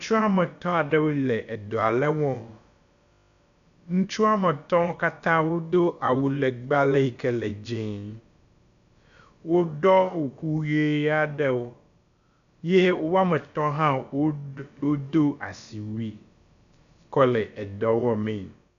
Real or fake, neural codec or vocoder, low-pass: fake; codec, 16 kHz, about 1 kbps, DyCAST, with the encoder's durations; 7.2 kHz